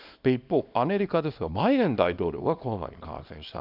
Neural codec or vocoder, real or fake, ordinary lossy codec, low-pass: codec, 24 kHz, 0.9 kbps, WavTokenizer, small release; fake; none; 5.4 kHz